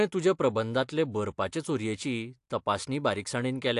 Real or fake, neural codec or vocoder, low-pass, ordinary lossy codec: real; none; 10.8 kHz; AAC, 64 kbps